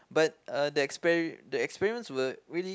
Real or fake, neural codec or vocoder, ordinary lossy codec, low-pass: real; none; none; none